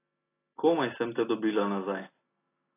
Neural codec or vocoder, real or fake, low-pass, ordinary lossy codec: none; real; 3.6 kHz; AAC, 24 kbps